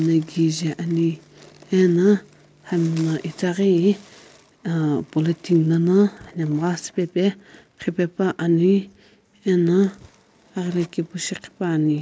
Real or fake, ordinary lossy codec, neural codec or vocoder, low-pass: real; none; none; none